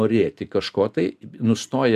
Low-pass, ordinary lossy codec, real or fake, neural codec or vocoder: 14.4 kHz; MP3, 96 kbps; fake; vocoder, 44.1 kHz, 128 mel bands every 256 samples, BigVGAN v2